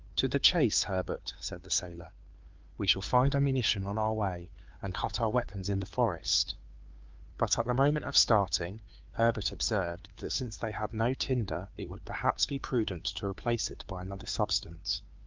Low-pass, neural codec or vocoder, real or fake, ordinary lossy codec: 7.2 kHz; codec, 16 kHz, 2 kbps, FunCodec, trained on Chinese and English, 25 frames a second; fake; Opus, 32 kbps